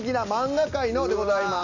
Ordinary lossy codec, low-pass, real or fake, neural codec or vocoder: AAC, 48 kbps; 7.2 kHz; real; none